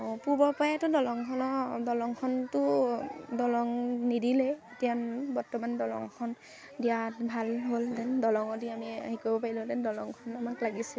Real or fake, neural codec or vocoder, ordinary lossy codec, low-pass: real; none; none; none